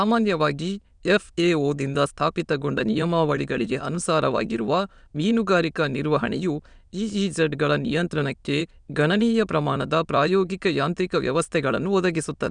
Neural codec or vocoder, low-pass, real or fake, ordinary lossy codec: autoencoder, 22.05 kHz, a latent of 192 numbers a frame, VITS, trained on many speakers; 9.9 kHz; fake; none